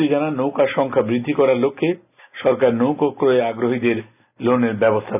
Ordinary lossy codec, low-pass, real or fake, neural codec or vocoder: none; 3.6 kHz; real; none